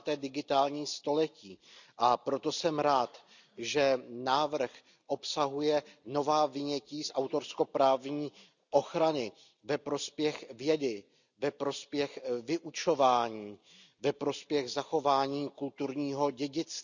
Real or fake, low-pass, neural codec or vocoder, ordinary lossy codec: real; 7.2 kHz; none; none